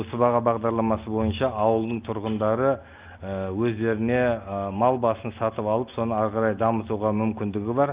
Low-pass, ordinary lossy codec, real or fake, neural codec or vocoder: 3.6 kHz; Opus, 32 kbps; real; none